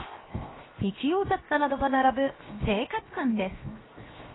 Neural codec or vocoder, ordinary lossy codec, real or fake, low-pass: codec, 16 kHz, 0.7 kbps, FocalCodec; AAC, 16 kbps; fake; 7.2 kHz